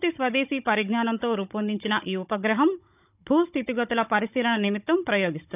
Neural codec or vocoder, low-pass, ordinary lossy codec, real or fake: codec, 16 kHz, 16 kbps, FunCodec, trained on Chinese and English, 50 frames a second; 3.6 kHz; none; fake